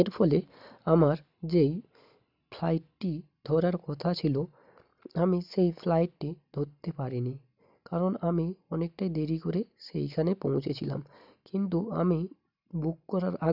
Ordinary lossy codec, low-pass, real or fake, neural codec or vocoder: none; 5.4 kHz; real; none